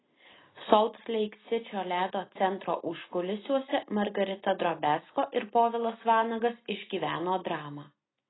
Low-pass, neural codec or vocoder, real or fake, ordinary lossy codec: 7.2 kHz; none; real; AAC, 16 kbps